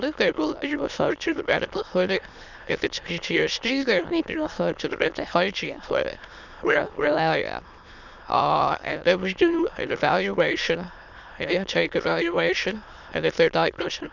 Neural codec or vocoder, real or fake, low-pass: autoencoder, 22.05 kHz, a latent of 192 numbers a frame, VITS, trained on many speakers; fake; 7.2 kHz